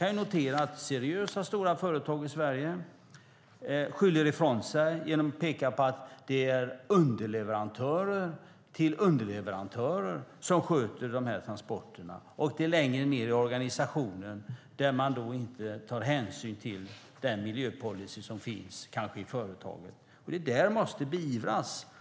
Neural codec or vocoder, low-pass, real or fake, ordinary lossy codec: none; none; real; none